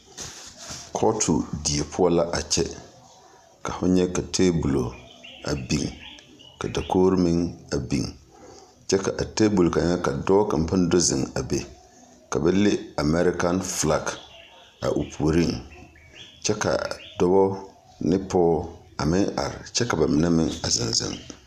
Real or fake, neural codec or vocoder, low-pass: real; none; 14.4 kHz